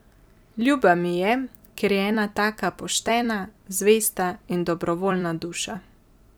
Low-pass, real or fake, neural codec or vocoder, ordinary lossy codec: none; fake; vocoder, 44.1 kHz, 128 mel bands every 256 samples, BigVGAN v2; none